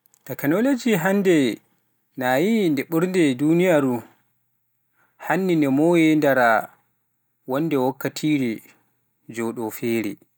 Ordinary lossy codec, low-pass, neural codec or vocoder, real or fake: none; none; none; real